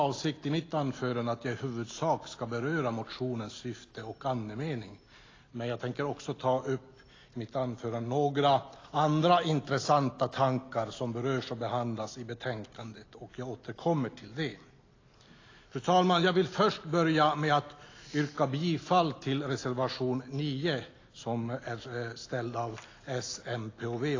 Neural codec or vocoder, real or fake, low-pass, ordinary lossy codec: none; real; 7.2 kHz; AAC, 32 kbps